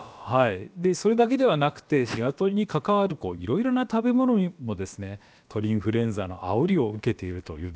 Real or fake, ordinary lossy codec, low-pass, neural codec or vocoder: fake; none; none; codec, 16 kHz, about 1 kbps, DyCAST, with the encoder's durations